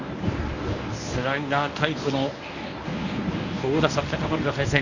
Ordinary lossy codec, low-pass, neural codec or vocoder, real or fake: none; 7.2 kHz; codec, 24 kHz, 0.9 kbps, WavTokenizer, medium speech release version 1; fake